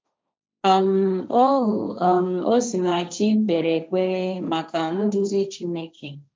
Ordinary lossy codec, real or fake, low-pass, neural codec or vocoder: none; fake; none; codec, 16 kHz, 1.1 kbps, Voila-Tokenizer